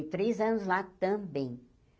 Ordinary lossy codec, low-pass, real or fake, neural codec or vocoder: none; none; real; none